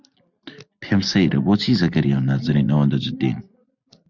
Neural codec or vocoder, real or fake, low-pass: none; real; 7.2 kHz